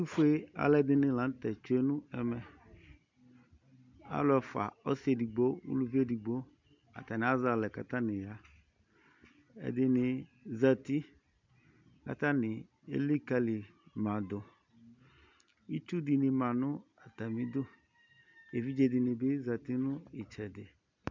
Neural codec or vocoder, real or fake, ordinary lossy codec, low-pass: none; real; MP3, 64 kbps; 7.2 kHz